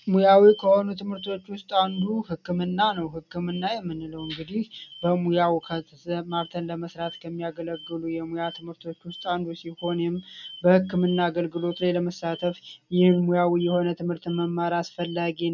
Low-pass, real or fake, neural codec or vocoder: 7.2 kHz; real; none